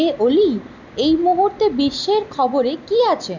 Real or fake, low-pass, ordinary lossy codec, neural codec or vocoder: real; 7.2 kHz; none; none